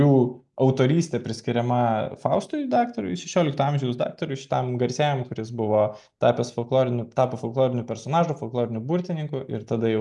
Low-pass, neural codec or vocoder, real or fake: 10.8 kHz; none; real